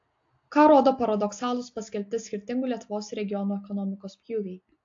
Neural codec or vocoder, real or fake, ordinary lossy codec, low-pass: none; real; AAC, 48 kbps; 7.2 kHz